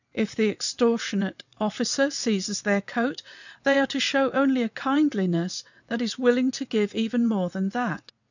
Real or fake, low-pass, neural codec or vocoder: fake; 7.2 kHz; vocoder, 22.05 kHz, 80 mel bands, WaveNeXt